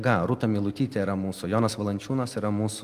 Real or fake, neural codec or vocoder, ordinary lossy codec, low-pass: real; none; Opus, 32 kbps; 14.4 kHz